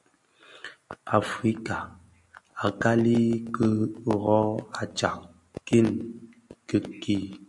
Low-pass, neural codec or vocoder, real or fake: 10.8 kHz; none; real